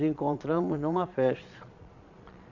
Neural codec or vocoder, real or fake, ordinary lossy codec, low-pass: vocoder, 22.05 kHz, 80 mel bands, Vocos; fake; none; 7.2 kHz